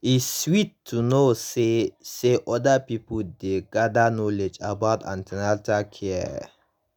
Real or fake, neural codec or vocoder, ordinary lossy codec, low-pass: real; none; none; none